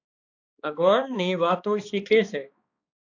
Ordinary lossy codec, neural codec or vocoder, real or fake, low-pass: AAC, 48 kbps; codec, 16 kHz, 2 kbps, X-Codec, HuBERT features, trained on balanced general audio; fake; 7.2 kHz